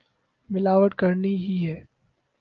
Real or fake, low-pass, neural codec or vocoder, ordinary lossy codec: real; 7.2 kHz; none; Opus, 24 kbps